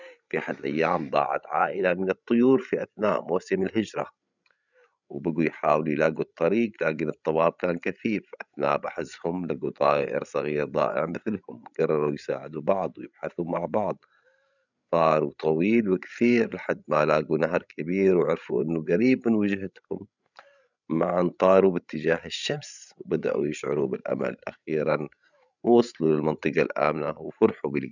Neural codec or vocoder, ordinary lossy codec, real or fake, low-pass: codec, 16 kHz, 16 kbps, FreqCodec, larger model; none; fake; 7.2 kHz